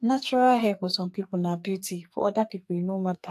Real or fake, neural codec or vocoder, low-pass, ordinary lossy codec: fake; codec, 44.1 kHz, 2.6 kbps, SNAC; 14.4 kHz; AAC, 64 kbps